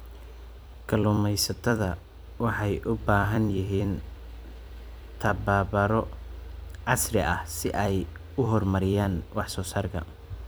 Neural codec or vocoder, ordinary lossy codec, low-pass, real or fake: vocoder, 44.1 kHz, 128 mel bands every 256 samples, BigVGAN v2; none; none; fake